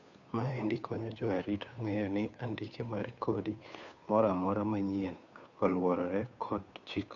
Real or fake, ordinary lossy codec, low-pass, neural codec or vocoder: fake; none; 7.2 kHz; codec, 16 kHz, 2 kbps, FunCodec, trained on Chinese and English, 25 frames a second